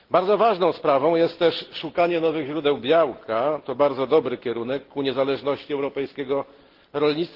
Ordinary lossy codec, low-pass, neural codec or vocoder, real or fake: Opus, 16 kbps; 5.4 kHz; none; real